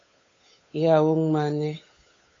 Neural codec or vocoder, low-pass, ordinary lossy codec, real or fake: codec, 16 kHz, 8 kbps, FunCodec, trained on Chinese and English, 25 frames a second; 7.2 kHz; AAC, 32 kbps; fake